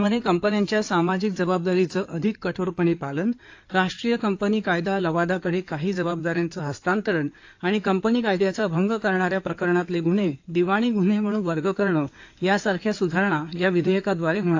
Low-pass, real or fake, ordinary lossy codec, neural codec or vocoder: 7.2 kHz; fake; AAC, 48 kbps; codec, 16 kHz in and 24 kHz out, 2.2 kbps, FireRedTTS-2 codec